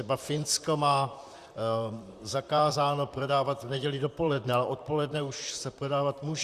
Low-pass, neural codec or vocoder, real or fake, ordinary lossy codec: 14.4 kHz; vocoder, 44.1 kHz, 128 mel bands, Pupu-Vocoder; fake; Opus, 64 kbps